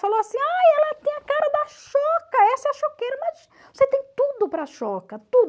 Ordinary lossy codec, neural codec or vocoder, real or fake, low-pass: none; none; real; none